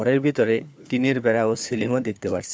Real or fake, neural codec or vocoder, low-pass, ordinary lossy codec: fake; codec, 16 kHz, 4 kbps, FunCodec, trained on LibriTTS, 50 frames a second; none; none